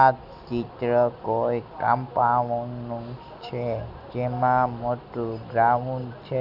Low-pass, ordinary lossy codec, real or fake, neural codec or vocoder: 5.4 kHz; none; real; none